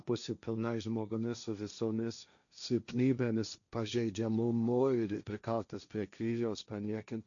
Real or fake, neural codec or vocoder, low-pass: fake; codec, 16 kHz, 1.1 kbps, Voila-Tokenizer; 7.2 kHz